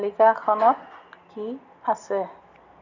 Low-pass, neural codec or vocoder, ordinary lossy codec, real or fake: 7.2 kHz; none; none; real